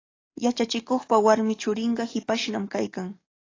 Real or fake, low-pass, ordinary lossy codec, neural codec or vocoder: fake; 7.2 kHz; AAC, 32 kbps; codec, 44.1 kHz, 7.8 kbps, DAC